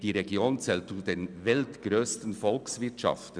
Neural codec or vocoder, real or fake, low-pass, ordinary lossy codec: none; real; 9.9 kHz; none